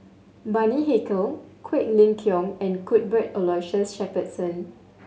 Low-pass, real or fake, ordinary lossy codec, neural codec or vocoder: none; real; none; none